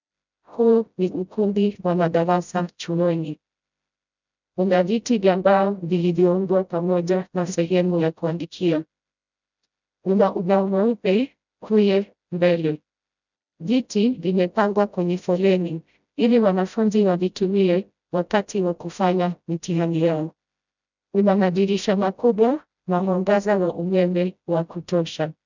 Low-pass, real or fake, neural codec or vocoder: 7.2 kHz; fake; codec, 16 kHz, 0.5 kbps, FreqCodec, smaller model